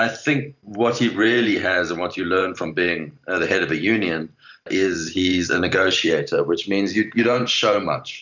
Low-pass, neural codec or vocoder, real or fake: 7.2 kHz; vocoder, 44.1 kHz, 128 mel bands every 512 samples, BigVGAN v2; fake